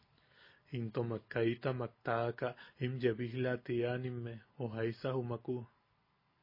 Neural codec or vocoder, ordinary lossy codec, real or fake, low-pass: none; MP3, 24 kbps; real; 5.4 kHz